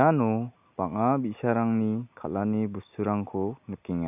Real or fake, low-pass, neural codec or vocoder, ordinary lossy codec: real; 3.6 kHz; none; none